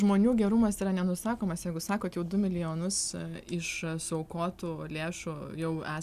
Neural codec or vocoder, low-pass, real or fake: none; 14.4 kHz; real